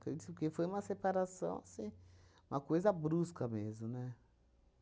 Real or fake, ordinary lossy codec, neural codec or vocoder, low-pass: real; none; none; none